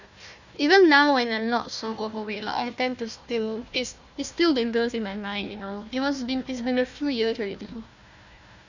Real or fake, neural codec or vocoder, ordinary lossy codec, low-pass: fake; codec, 16 kHz, 1 kbps, FunCodec, trained on Chinese and English, 50 frames a second; none; 7.2 kHz